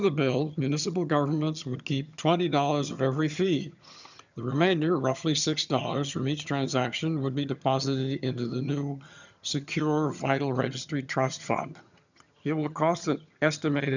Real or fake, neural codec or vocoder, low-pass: fake; vocoder, 22.05 kHz, 80 mel bands, HiFi-GAN; 7.2 kHz